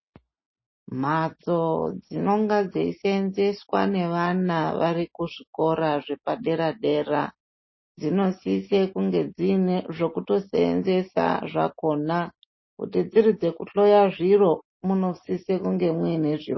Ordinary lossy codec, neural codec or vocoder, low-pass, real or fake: MP3, 24 kbps; none; 7.2 kHz; real